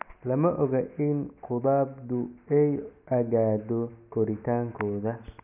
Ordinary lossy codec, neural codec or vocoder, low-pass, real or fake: none; none; 3.6 kHz; real